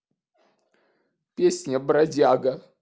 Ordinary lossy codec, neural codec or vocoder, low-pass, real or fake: none; none; none; real